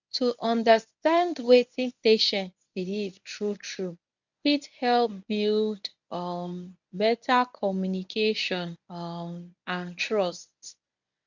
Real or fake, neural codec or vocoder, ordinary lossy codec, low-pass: fake; codec, 24 kHz, 0.9 kbps, WavTokenizer, medium speech release version 1; none; 7.2 kHz